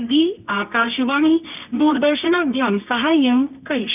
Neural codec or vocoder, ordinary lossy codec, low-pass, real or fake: codec, 24 kHz, 0.9 kbps, WavTokenizer, medium music audio release; none; 3.6 kHz; fake